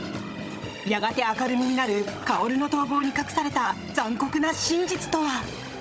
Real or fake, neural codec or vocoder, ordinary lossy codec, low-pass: fake; codec, 16 kHz, 16 kbps, FunCodec, trained on Chinese and English, 50 frames a second; none; none